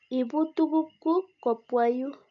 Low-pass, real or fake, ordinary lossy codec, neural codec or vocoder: 7.2 kHz; real; none; none